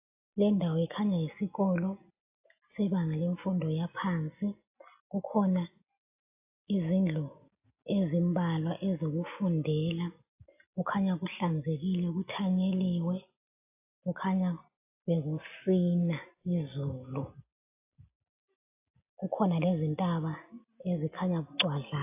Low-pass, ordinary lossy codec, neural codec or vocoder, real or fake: 3.6 kHz; AAC, 32 kbps; none; real